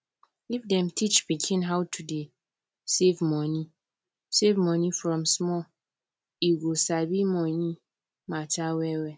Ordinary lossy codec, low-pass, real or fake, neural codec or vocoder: none; none; real; none